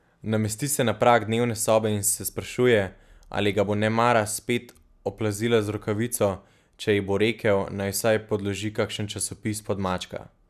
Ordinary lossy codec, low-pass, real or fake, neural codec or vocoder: none; 14.4 kHz; real; none